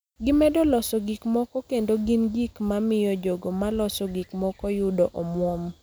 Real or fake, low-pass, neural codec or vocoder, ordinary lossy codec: real; none; none; none